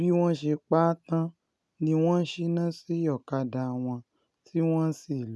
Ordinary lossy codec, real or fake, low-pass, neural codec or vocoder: none; real; none; none